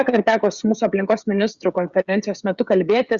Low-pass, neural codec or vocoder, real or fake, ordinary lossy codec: 7.2 kHz; codec, 16 kHz, 16 kbps, FreqCodec, smaller model; fake; Opus, 64 kbps